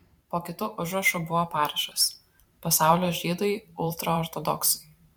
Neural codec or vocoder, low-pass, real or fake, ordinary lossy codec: vocoder, 44.1 kHz, 128 mel bands every 512 samples, BigVGAN v2; 19.8 kHz; fake; MP3, 96 kbps